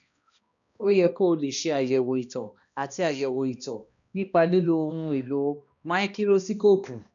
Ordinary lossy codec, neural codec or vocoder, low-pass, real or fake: none; codec, 16 kHz, 1 kbps, X-Codec, HuBERT features, trained on balanced general audio; 7.2 kHz; fake